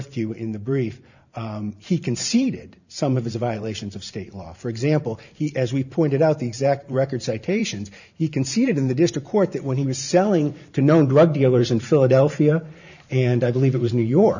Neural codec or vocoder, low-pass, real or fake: vocoder, 44.1 kHz, 128 mel bands every 512 samples, BigVGAN v2; 7.2 kHz; fake